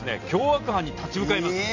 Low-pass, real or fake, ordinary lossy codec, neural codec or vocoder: 7.2 kHz; real; none; none